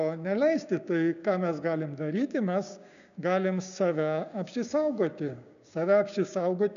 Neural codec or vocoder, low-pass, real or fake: codec, 16 kHz, 6 kbps, DAC; 7.2 kHz; fake